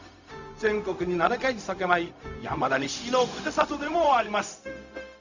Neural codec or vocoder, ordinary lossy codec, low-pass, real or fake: codec, 16 kHz, 0.4 kbps, LongCat-Audio-Codec; none; 7.2 kHz; fake